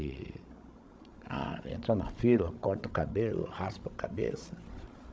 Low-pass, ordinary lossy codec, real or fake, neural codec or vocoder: none; none; fake; codec, 16 kHz, 16 kbps, FreqCodec, larger model